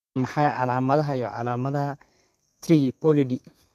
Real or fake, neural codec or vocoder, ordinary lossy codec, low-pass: fake; codec, 32 kHz, 1.9 kbps, SNAC; none; 14.4 kHz